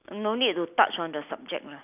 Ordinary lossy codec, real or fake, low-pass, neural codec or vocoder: none; real; 3.6 kHz; none